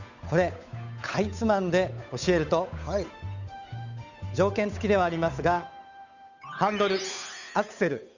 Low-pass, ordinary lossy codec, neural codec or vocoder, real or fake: 7.2 kHz; none; codec, 16 kHz, 8 kbps, FunCodec, trained on Chinese and English, 25 frames a second; fake